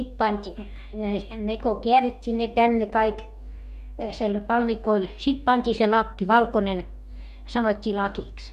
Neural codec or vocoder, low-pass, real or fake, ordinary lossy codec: codec, 44.1 kHz, 2.6 kbps, DAC; 14.4 kHz; fake; none